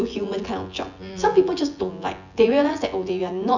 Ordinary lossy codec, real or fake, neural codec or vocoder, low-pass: none; fake; vocoder, 24 kHz, 100 mel bands, Vocos; 7.2 kHz